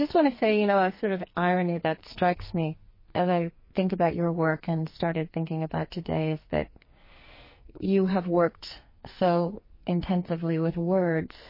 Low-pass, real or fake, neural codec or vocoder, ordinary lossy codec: 5.4 kHz; fake; codec, 44.1 kHz, 2.6 kbps, SNAC; MP3, 24 kbps